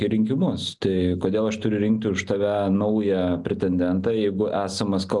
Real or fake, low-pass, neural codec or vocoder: real; 10.8 kHz; none